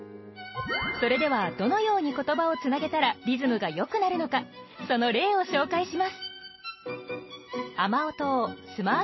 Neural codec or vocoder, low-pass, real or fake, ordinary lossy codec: none; 7.2 kHz; real; MP3, 24 kbps